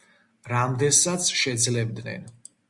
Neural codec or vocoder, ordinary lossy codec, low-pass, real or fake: none; Opus, 64 kbps; 10.8 kHz; real